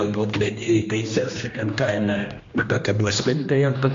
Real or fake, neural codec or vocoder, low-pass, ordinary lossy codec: fake; codec, 16 kHz, 1 kbps, X-Codec, HuBERT features, trained on general audio; 7.2 kHz; MP3, 48 kbps